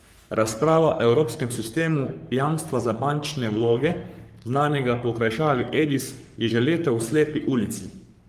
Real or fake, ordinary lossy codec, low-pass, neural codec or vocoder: fake; Opus, 32 kbps; 14.4 kHz; codec, 44.1 kHz, 3.4 kbps, Pupu-Codec